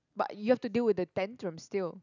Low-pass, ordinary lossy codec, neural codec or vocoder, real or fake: 7.2 kHz; none; none; real